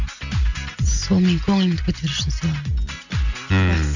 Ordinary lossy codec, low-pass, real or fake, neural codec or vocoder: none; 7.2 kHz; real; none